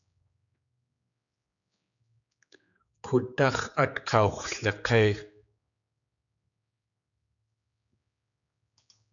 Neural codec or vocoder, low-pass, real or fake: codec, 16 kHz, 4 kbps, X-Codec, HuBERT features, trained on general audio; 7.2 kHz; fake